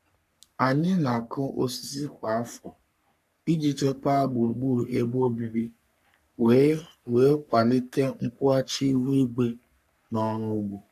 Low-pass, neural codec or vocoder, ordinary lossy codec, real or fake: 14.4 kHz; codec, 44.1 kHz, 3.4 kbps, Pupu-Codec; none; fake